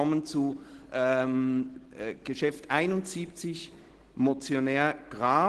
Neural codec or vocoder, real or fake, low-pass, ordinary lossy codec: none; real; 10.8 kHz; Opus, 16 kbps